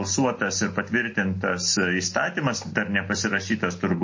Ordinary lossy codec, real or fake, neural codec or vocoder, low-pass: MP3, 32 kbps; real; none; 7.2 kHz